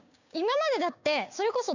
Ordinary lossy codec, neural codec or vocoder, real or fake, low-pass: none; codec, 16 kHz, 6 kbps, DAC; fake; 7.2 kHz